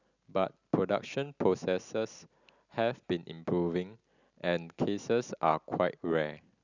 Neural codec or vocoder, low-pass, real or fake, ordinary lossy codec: none; 7.2 kHz; real; none